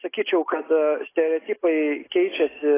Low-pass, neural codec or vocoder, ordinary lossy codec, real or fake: 3.6 kHz; none; AAC, 16 kbps; real